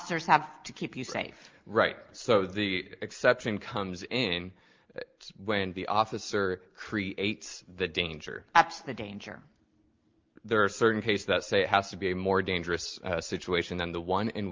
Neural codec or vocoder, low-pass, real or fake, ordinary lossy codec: none; 7.2 kHz; real; Opus, 32 kbps